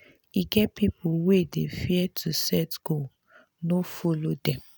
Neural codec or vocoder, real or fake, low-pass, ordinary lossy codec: none; real; none; none